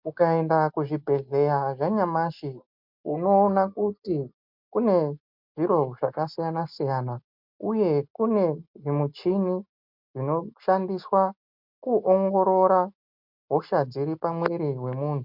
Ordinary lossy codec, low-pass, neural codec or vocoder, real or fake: MP3, 48 kbps; 5.4 kHz; none; real